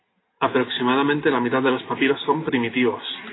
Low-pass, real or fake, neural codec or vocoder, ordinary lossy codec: 7.2 kHz; real; none; AAC, 16 kbps